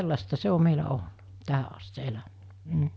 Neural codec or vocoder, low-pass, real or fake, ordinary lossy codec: none; none; real; none